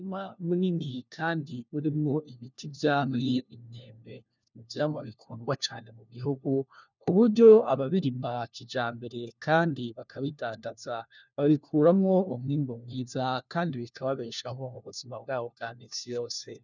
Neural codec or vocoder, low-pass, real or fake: codec, 16 kHz, 1 kbps, FunCodec, trained on LibriTTS, 50 frames a second; 7.2 kHz; fake